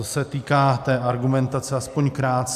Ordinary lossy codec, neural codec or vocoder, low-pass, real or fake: AAC, 96 kbps; none; 14.4 kHz; real